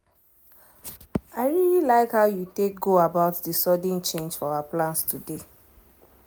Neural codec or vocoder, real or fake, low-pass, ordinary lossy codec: none; real; none; none